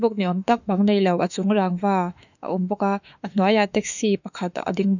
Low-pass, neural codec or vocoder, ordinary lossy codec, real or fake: 7.2 kHz; autoencoder, 48 kHz, 32 numbers a frame, DAC-VAE, trained on Japanese speech; none; fake